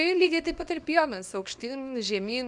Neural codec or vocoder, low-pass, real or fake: codec, 24 kHz, 0.9 kbps, WavTokenizer, small release; 10.8 kHz; fake